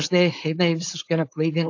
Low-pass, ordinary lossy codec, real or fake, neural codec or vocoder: 7.2 kHz; AAC, 48 kbps; fake; codec, 16 kHz, 4.8 kbps, FACodec